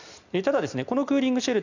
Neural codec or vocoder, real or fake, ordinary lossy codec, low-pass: none; real; none; 7.2 kHz